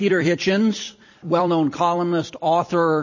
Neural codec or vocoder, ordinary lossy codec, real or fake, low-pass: vocoder, 44.1 kHz, 128 mel bands every 256 samples, BigVGAN v2; MP3, 32 kbps; fake; 7.2 kHz